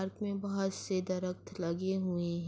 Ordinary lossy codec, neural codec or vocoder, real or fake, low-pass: none; none; real; none